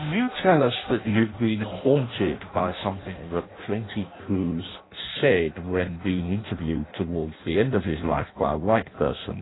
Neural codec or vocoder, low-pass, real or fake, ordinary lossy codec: codec, 16 kHz in and 24 kHz out, 0.6 kbps, FireRedTTS-2 codec; 7.2 kHz; fake; AAC, 16 kbps